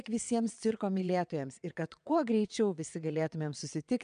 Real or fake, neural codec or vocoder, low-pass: fake; vocoder, 22.05 kHz, 80 mel bands, WaveNeXt; 9.9 kHz